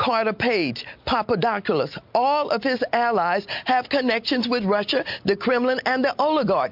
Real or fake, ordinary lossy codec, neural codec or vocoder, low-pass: real; MP3, 48 kbps; none; 5.4 kHz